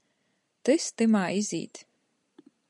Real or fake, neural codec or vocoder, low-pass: real; none; 10.8 kHz